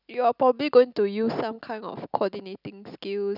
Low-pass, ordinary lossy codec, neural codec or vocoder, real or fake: 5.4 kHz; none; none; real